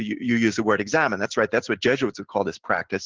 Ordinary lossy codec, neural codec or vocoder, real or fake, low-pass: Opus, 16 kbps; none; real; 7.2 kHz